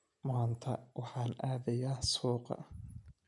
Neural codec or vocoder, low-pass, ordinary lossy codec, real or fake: none; 10.8 kHz; none; real